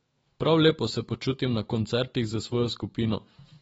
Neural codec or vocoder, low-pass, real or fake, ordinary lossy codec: autoencoder, 48 kHz, 128 numbers a frame, DAC-VAE, trained on Japanese speech; 19.8 kHz; fake; AAC, 24 kbps